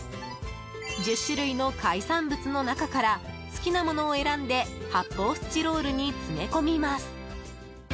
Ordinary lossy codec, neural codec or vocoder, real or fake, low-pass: none; none; real; none